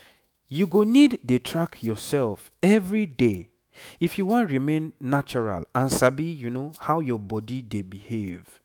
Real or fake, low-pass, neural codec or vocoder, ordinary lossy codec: fake; none; autoencoder, 48 kHz, 128 numbers a frame, DAC-VAE, trained on Japanese speech; none